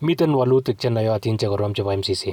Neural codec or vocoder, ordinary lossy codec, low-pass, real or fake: none; none; 19.8 kHz; real